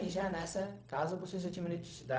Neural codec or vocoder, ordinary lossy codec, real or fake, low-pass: codec, 16 kHz, 0.4 kbps, LongCat-Audio-Codec; none; fake; none